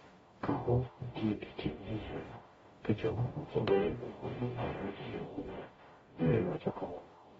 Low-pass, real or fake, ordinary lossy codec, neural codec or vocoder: 19.8 kHz; fake; AAC, 24 kbps; codec, 44.1 kHz, 0.9 kbps, DAC